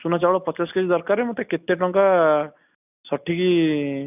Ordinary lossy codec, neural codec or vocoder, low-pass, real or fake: none; none; 3.6 kHz; real